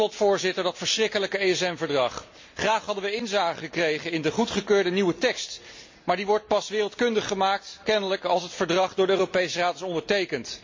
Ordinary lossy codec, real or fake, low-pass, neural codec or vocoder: MP3, 48 kbps; real; 7.2 kHz; none